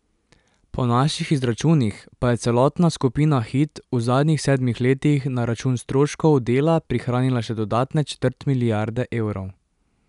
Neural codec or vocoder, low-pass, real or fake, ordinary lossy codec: none; 10.8 kHz; real; none